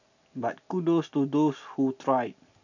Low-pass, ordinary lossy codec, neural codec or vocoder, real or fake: 7.2 kHz; none; none; real